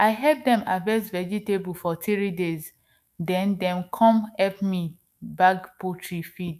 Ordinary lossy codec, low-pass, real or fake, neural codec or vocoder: none; 14.4 kHz; fake; autoencoder, 48 kHz, 128 numbers a frame, DAC-VAE, trained on Japanese speech